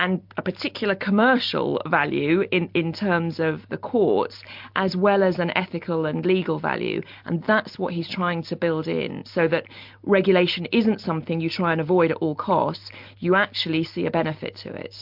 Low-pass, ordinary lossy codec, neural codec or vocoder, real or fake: 5.4 kHz; MP3, 48 kbps; none; real